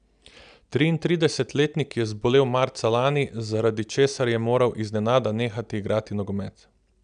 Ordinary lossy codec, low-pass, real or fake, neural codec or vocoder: none; 9.9 kHz; real; none